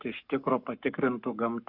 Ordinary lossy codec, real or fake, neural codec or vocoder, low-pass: Opus, 64 kbps; fake; codec, 44.1 kHz, 7.8 kbps, Pupu-Codec; 5.4 kHz